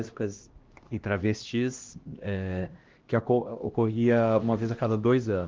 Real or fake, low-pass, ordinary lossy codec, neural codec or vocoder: fake; 7.2 kHz; Opus, 16 kbps; codec, 16 kHz, 1 kbps, X-Codec, HuBERT features, trained on LibriSpeech